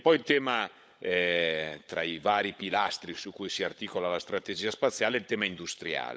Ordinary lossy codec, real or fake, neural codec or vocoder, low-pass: none; fake; codec, 16 kHz, 16 kbps, FunCodec, trained on LibriTTS, 50 frames a second; none